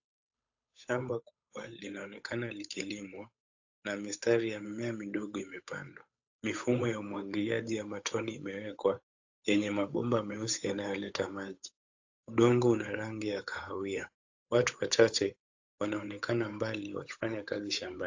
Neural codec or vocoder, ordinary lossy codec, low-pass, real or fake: codec, 16 kHz, 8 kbps, FunCodec, trained on Chinese and English, 25 frames a second; AAC, 48 kbps; 7.2 kHz; fake